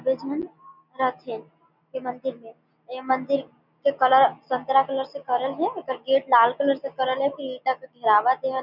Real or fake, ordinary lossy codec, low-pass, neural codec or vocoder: real; none; 5.4 kHz; none